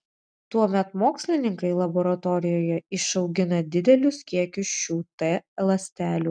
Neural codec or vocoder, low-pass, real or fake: none; 9.9 kHz; real